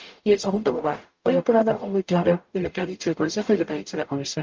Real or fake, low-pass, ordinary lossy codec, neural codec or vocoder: fake; 7.2 kHz; Opus, 24 kbps; codec, 44.1 kHz, 0.9 kbps, DAC